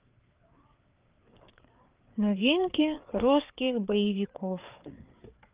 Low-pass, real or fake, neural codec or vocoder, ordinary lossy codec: 3.6 kHz; fake; codec, 16 kHz, 4 kbps, FreqCodec, larger model; Opus, 32 kbps